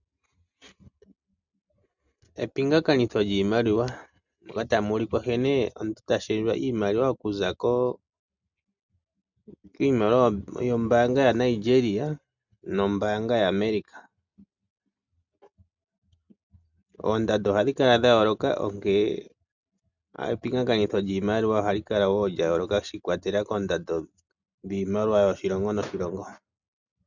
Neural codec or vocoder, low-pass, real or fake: none; 7.2 kHz; real